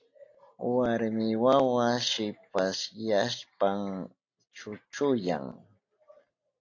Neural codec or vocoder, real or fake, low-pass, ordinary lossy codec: none; real; 7.2 kHz; AAC, 48 kbps